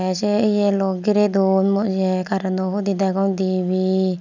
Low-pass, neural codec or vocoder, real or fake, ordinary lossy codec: 7.2 kHz; none; real; none